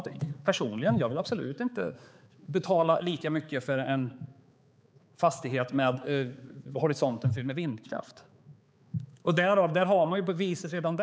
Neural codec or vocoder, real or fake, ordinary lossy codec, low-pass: codec, 16 kHz, 4 kbps, X-Codec, HuBERT features, trained on balanced general audio; fake; none; none